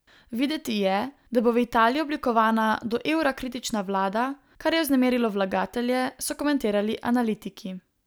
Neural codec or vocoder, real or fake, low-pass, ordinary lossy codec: none; real; none; none